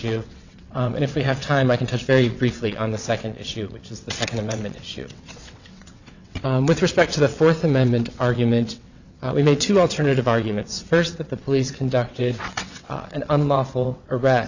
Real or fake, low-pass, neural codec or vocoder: fake; 7.2 kHz; vocoder, 22.05 kHz, 80 mel bands, WaveNeXt